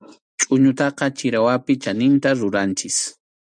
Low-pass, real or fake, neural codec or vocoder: 9.9 kHz; real; none